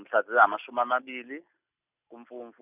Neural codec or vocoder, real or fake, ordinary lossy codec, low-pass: none; real; none; 3.6 kHz